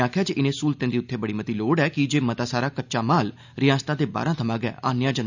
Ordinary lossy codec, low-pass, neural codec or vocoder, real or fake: none; 7.2 kHz; none; real